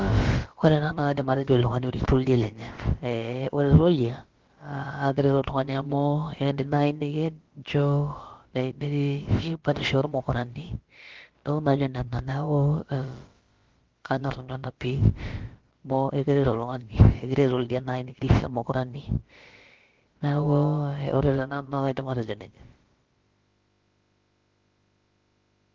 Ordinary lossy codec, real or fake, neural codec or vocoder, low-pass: Opus, 16 kbps; fake; codec, 16 kHz, about 1 kbps, DyCAST, with the encoder's durations; 7.2 kHz